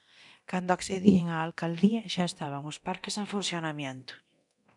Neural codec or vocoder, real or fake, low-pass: codec, 24 kHz, 0.9 kbps, DualCodec; fake; 10.8 kHz